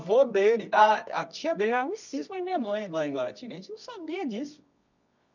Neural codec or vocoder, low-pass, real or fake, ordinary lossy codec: codec, 24 kHz, 0.9 kbps, WavTokenizer, medium music audio release; 7.2 kHz; fake; none